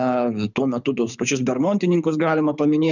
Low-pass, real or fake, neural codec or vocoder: 7.2 kHz; fake; codec, 24 kHz, 6 kbps, HILCodec